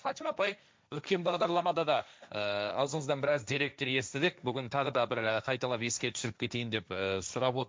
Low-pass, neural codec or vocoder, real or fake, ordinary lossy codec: none; codec, 16 kHz, 1.1 kbps, Voila-Tokenizer; fake; none